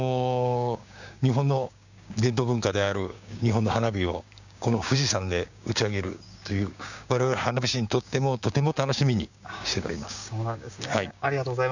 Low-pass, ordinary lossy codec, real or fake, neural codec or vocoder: 7.2 kHz; none; fake; codec, 16 kHz, 6 kbps, DAC